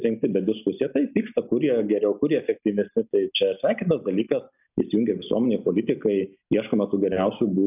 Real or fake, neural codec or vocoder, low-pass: real; none; 3.6 kHz